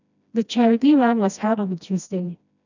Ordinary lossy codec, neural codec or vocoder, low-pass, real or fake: none; codec, 16 kHz, 1 kbps, FreqCodec, smaller model; 7.2 kHz; fake